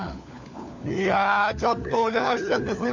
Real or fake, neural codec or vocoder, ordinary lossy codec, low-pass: fake; codec, 16 kHz, 4 kbps, FunCodec, trained on LibriTTS, 50 frames a second; none; 7.2 kHz